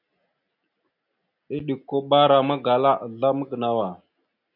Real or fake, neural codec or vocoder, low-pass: real; none; 5.4 kHz